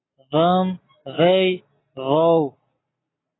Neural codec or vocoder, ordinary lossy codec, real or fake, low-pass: none; AAC, 16 kbps; real; 7.2 kHz